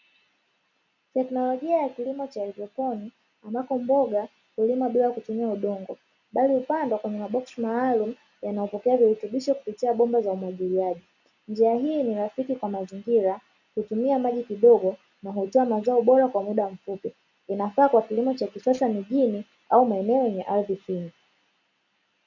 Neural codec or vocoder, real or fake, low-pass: none; real; 7.2 kHz